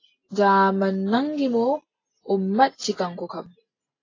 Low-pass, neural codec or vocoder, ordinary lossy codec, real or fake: 7.2 kHz; none; AAC, 32 kbps; real